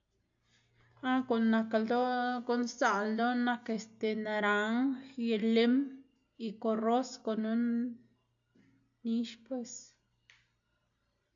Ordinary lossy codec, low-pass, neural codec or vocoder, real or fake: none; 7.2 kHz; none; real